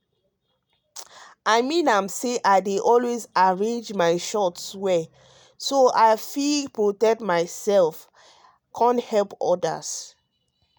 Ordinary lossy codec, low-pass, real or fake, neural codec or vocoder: none; none; real; none